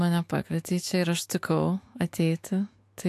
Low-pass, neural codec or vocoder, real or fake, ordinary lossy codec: 14.4 kHz; autoencoder, 48 kHz, 128 numbers a frame, DAC-VAE, trained on Japanese speech; fake; AAC, 64 kbps